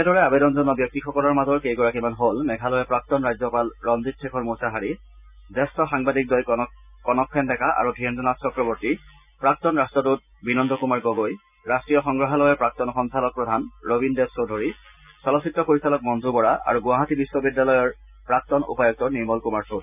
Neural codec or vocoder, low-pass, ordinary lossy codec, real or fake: none; 3.6 kHz; none; real